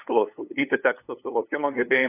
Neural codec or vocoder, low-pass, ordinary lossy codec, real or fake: codec, 16 kHz, 8 kbps, FunCodec, trained on LibriTTS, 25 frames a second; 3.6 kHz; AAC, 24 kbps; fake